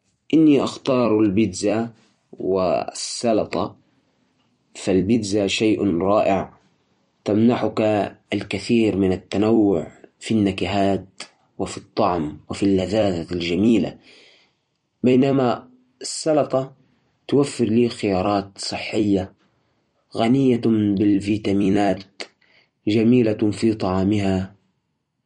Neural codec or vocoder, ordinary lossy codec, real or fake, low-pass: vocoder, 44.1 kHz, 128 mel bands every 256 samples, BigVGAN v2; MP3, 48 kbps; fake; 19.8 kHz